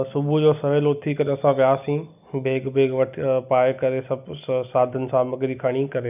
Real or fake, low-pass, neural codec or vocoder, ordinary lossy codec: real; 3.6 kHz; none; none